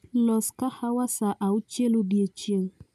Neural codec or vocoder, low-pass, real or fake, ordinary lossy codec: none; 14.4 kHz; real; none